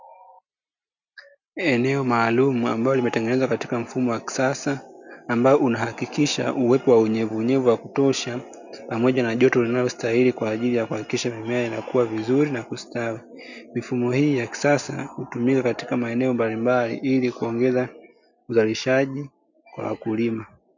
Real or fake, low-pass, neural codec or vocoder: real; 7.2 kHz; none